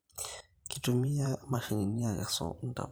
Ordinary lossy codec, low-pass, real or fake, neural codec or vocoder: none; none; fake; vocoder, 44.1 kHz, 128 mel bands every 512 samples, BigVGAN v2